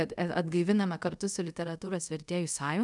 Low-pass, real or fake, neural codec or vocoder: 10.8 kHz; fake; codec, 24 kHz, 0.5 kbps, DualCodec